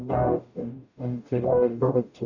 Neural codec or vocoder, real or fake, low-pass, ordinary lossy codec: codec, 44.1 kHz, 0.9 kbps, DAC; fake; 7.2 kHz; none